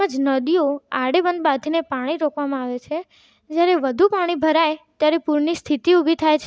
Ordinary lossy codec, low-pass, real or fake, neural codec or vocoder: none; none; real; none